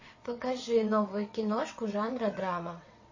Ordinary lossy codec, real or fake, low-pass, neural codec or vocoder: MP3, 32 kbps; fake; 7.2 kHz; vocoder, 22.05 kHz, 80 mel bands, WaveNeXt